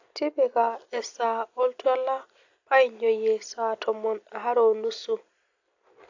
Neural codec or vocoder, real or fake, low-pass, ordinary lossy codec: none; real; 7.2 kHz; AAC, 48 kbps